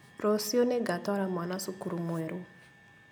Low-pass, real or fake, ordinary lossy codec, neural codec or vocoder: none; real; none; none